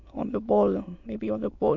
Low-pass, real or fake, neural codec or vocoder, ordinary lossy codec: 7.2 kHz; fake; autoencoder, 22.05 kHz, a latent of 192 numbers a frame, VITS, trained on many speakers; MP3, 64 kbps